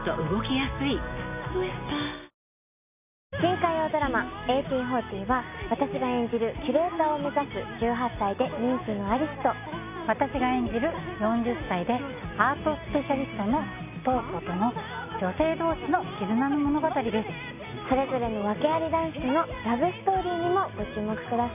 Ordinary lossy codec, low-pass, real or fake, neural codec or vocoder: none; 3.6 kHz; real; none